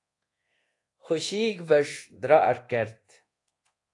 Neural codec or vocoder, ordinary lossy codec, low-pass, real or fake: codec, 24 kHz, 0.9 kbps, DualCodec; AAC, 48 kbps; 10.8 kHz; fake